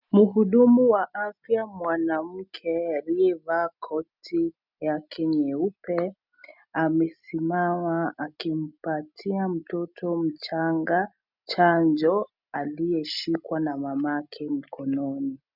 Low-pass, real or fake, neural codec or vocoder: 5.4 kHz; real; none